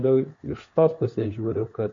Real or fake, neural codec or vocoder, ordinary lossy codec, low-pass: fake; codec, 16 kHz, 4 kbps, FunCodec, trained on LibriTTS, 50 frames a second; MP3, 48 kbps; 7.2 kHz